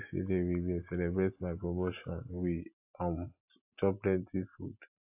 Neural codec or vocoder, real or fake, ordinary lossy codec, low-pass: none; real; AAC, 24 kbps; 3.6 kHz